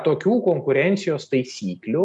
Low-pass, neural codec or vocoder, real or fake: 10.8 kHz; none; real